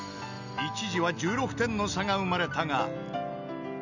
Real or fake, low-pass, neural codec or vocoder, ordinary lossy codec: real; 7.2 kHz; none; none